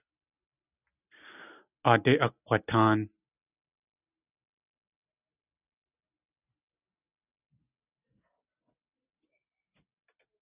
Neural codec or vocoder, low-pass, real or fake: none; 3.6 kHz; real